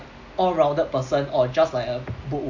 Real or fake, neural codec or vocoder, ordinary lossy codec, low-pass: real; none; none; 7.2 kHz